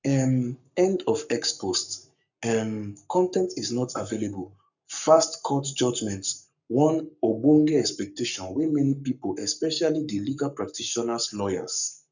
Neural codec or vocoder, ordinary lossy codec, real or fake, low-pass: codec, 44.1 kHz, 7.8 kbps, Pupu-Codec; none; fake; 7.2 kHz